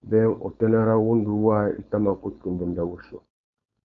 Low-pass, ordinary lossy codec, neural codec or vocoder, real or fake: 7.2 kHz; AAC, 64 kbps; codec, 16 kHz, 4.8 kbps, FACodec; fake